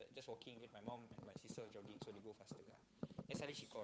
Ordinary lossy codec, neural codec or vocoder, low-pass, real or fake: none; codec, 16 kHz, 8 kbps, FunCodec, trained on Chinese and English, 25 frames a second; none; fake